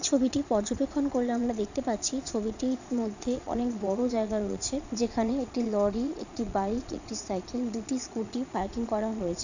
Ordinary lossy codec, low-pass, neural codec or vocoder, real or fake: none; 7.2 kHz; vocoder, 22.05 kHz, 80 mel bands, Vocos; fake